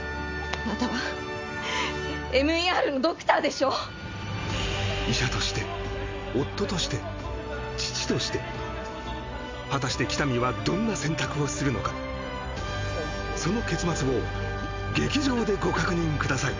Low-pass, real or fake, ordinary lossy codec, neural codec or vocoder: 7.2 kHz; real; MP3, 64 kbps; none